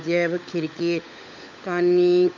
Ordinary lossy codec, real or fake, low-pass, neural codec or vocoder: none; fake; 7.2 kHz; codec, 16 kHz, 16 kbps, FunCodec, trained on LibriTTS, 50 frames a second